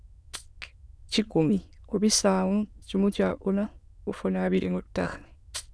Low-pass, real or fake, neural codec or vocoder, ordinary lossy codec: none; fake; autoencoder, 22.05 kHz, a latent of 192 numbers a frame, VITS, trained on many speakers; none